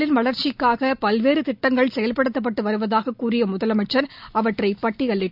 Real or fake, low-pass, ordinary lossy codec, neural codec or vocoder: real; 5.4 kHz; none; none